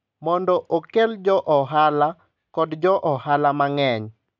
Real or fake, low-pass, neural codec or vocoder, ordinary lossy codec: real; 7.2 kHz; none; none